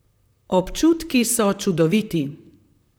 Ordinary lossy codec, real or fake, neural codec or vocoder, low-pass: none; fake; vocoder, 44.1 kHz, 128 mel bands, Pupu-Vocoder; none